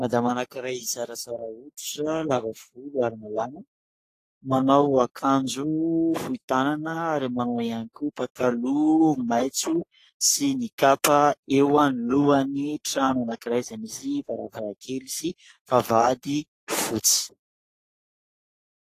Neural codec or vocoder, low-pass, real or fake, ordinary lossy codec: codec, 44.1 kHz, 3.4 kbps, Pupu-Codec; 14.4 kHz; fake; AAC, 48 kbps